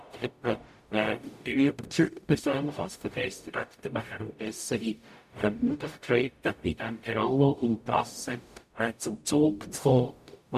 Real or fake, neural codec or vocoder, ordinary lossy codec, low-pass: fake; codec, 44.1 kHz, 0.9 kbps, DAC; none; 14.4 kHz